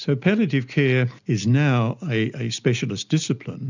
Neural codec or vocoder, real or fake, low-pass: none; real; 7.2 kHz